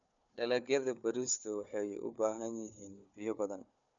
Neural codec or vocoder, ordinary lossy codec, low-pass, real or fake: codec, 16 kHz, 4 kbps, FunCodec, trained on Chinese and English, 50 frames a second; none; 7.2 kHz; fake